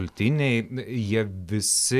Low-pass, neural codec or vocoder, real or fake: 14.4 kHz; none; real